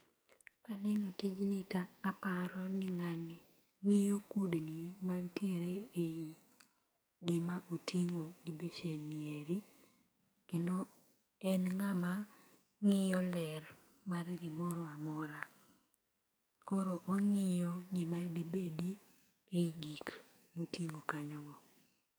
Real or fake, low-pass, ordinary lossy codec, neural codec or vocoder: fake; none; none; codec, 44.1 kHz, 2.6 kbps, SNAC